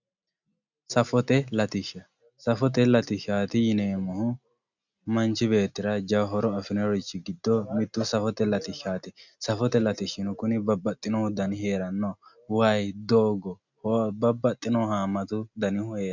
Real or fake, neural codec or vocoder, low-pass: real; none; 7.2 kHz